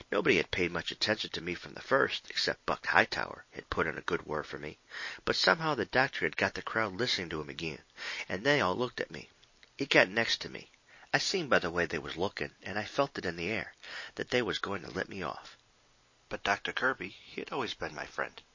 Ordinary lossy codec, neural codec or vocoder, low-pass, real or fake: MP3, 32 kbps; none; 7.2 kHz; real